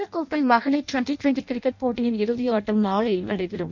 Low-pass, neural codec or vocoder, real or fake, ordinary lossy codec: 7.2 kHz; codec, 16 kHz in and 24 kHz out, 0.6 kbps, FireRedTTS-2 codec; fake; none